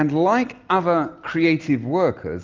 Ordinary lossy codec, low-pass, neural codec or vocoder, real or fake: Opus, 24 kbps; 7.2 kHz; none; real